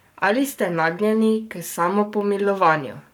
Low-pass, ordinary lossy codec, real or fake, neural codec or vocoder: none; none; fake; codec, 44.1 kHz, 7.8 kbps, Pupu-Codec